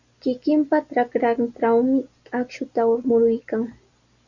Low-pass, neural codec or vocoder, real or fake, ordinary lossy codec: 7.2 kHz; none; real; Opus, 64 kbps